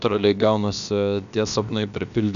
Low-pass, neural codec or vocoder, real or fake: 7.2 kHz; codec, 16 kHz, about 1 kbps, DyCAST, with the encoder's durations; fake